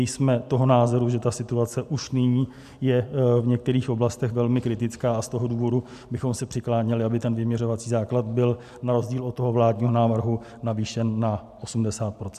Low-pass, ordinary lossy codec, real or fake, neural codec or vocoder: 14.4 kHz; AAC, 96 kbps; real; none